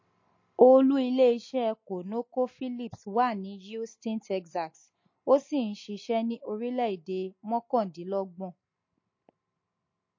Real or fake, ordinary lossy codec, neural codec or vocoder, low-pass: real; MP3, 32 kbps; none; 7.2 kHz